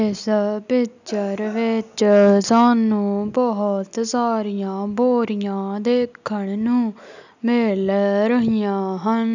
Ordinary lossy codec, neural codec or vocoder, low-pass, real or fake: none; none; 7.2 kHz; real